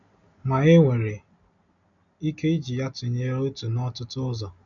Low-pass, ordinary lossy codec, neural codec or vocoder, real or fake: 7.2 kHz; none; none; real